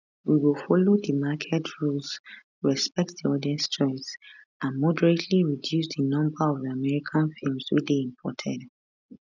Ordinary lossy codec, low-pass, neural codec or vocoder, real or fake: none; 7.2 kHz; none; real